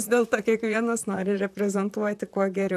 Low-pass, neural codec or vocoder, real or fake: 14.4 kHz; vocoder, 44.1 kHz, 128 mel bands, Pupu-Vocoder; fake